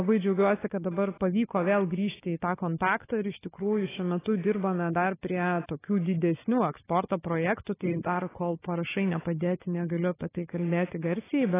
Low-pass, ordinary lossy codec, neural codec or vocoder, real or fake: 3.6 kHz; AAC, 16 kbps; codec, 16 kHz, 4 kbps, FunCodec, trained on Chinese and English, 50 frames a second; fake